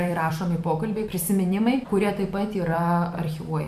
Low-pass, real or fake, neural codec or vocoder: 14.4 kHz; fake; vocoder, 44.1 kHz, 128 mel bands every 256 samples, BigVGAN v2